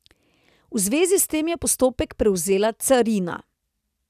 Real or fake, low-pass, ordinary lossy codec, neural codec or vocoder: real; 14.4 kHz; none; none